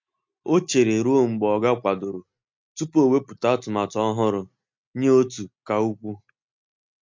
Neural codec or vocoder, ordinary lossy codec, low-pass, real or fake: none; MP3, 64 kbps; 7.2 kHz; real